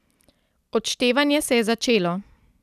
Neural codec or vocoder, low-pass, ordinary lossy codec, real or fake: none; 14.4 kHz; none; real